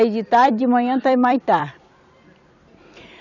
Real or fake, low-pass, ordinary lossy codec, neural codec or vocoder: fake; 7.2 kHz; none; vocoder, 44.1 kHz, 128 mel bands every 256 samples, BigVGAN v2